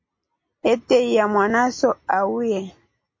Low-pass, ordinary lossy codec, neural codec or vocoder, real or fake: 7.2 kHz; MP3, 32 kbps; none; real